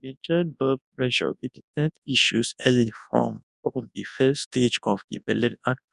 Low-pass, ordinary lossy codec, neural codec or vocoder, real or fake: 10.8 kHz; none; codec, 24 kHz, 0.9 kbps, WavTokenizer, large speech release; fake